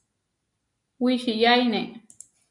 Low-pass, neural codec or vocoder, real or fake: 10.8 kHz; none; real